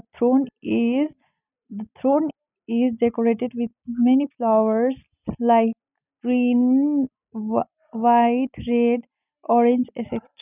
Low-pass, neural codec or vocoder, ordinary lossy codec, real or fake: 3.6 kHz; none; none; real